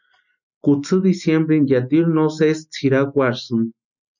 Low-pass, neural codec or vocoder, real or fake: 7.2 kHz; none; real